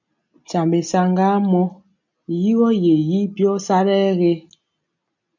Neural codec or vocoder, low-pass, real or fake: none; 7.2 kHz; real